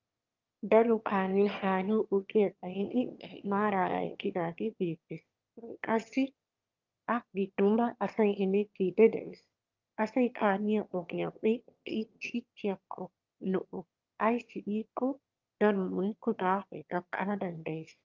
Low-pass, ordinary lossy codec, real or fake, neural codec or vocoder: 7.2 kHz; Opus, 24 kbps; fake; autoencoder, 22.05 kHz, a latent of 192 numbers a frame, VITS, trained on one speaker